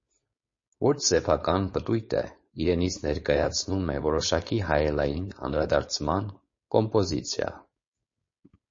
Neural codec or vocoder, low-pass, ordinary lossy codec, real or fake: codec, 16 kHz, 4.8 kbps, FACodec; 7.2 kHz; MP3, 32 kbps; fake